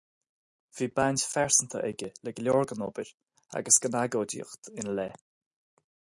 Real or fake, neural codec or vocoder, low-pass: real; none; 10.8 kHz